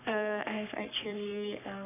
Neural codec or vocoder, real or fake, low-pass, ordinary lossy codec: codec, 44.1 kHz, 3.4 kbps, Pupu-Codec; fake; 3.6 kHz; none